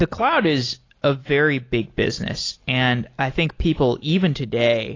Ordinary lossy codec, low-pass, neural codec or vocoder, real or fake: AAC, 32 kbps; 7.2 kHz; none; real